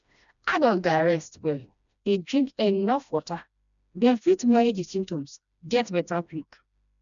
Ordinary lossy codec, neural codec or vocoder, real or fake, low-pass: none; codec, 16 kHz, 1 kbps, FreqCodec, smaller model; fake; 7.2 kHz